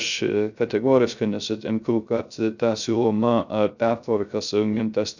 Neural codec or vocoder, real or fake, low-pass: codec, 16 kHz, 0.3 kbps, FocalCodec; fake; 7.2 kHz